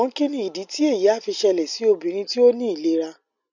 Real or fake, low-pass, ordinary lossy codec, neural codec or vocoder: real; 7.2 kHz; none; none